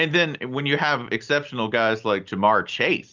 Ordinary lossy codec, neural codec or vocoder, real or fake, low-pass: Opus, 24 kbps; none; real; 7.2 kHz